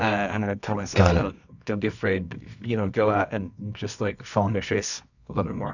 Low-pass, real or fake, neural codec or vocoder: 7.2 kHz; fake; codec, 24 kHz, 0.9 kbps, WavTokenizer, medium music audio release